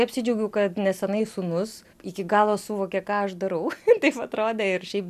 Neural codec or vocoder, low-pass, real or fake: none; 14.4 kHz; real